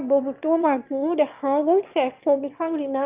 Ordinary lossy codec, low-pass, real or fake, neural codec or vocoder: Opus, 24 kbps; 3.6 kHz; fake; autoencoder, 22.05 kHz, a latent of 192 numbers a frame, VITS, trained on one speaker